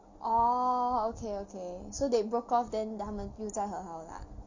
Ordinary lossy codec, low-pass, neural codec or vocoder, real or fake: Opus, 64 kbps; 7.2 kHz; none; real